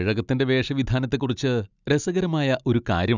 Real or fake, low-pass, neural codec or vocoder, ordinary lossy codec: real; 7.2 kHz; none; none